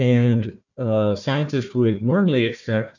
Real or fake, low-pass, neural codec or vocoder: fake; 7.2 kHz; codec, 16 kHz, 1 kbps, FunCodec, trained on Chinese and English, 50 frames a second